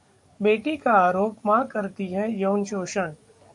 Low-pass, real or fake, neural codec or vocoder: 10.8 kHz; fake; codec, 44.1 kHz, 7.8 kbps, DAC